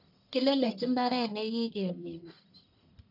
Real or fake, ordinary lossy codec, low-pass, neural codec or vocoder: fake; none; 5.4 kHz; codec, 44.1 kHz, 1.7 kbps, Pupu-Codec